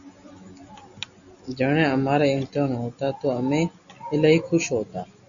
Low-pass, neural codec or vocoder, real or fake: 7.2 kHz; none; real